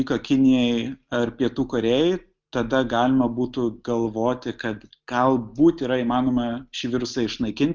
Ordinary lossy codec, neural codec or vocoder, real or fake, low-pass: Opus, 24 kbps; none; real; 7.2 kHz